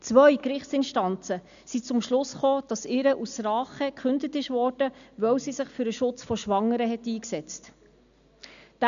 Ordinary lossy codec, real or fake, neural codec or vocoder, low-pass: none; real; none; 7.2 kHz